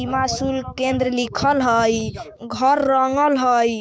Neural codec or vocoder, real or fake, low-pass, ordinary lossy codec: codec, 16 kHz, 6 kbps, DAC; fake; none; none